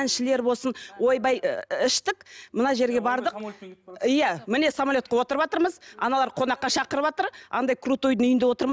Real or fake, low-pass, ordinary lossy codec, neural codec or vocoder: real; none; none; none